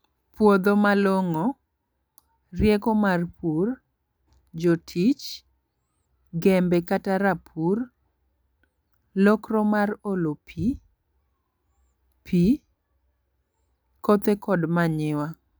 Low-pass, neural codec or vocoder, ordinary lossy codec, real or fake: none; none; none; real